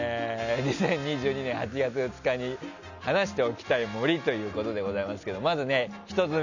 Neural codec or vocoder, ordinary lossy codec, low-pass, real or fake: none; none; 7.2 kHz; real